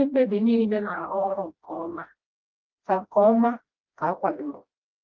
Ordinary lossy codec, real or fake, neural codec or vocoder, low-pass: Opus, 32 kbps; fake; codec, 16 kHz, 1 kbps, FreqCodec, smaller model; 7.2 kHz